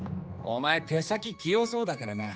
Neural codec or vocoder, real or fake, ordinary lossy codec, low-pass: codec, 16 kHz, 2 kbps, X-Codec, HuBERT features, trained on general audio; fake; none; none